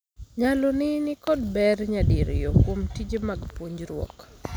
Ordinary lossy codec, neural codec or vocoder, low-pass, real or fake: none; none; none; real